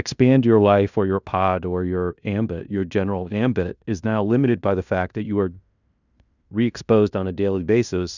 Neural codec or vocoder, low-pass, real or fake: codec, 16 kHz in and 24 kHz out, 0.9 kbps, LongCat-Audio-Codec, fine tuned four codebook decoder; 7.2 kHz; fake